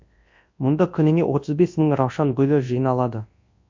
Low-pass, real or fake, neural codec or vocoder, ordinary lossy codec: 7.2 kHz; fake; codec, 24 kHz, 0.9 kbps, WavTokenizer, large speech release; MP3, 48 kbps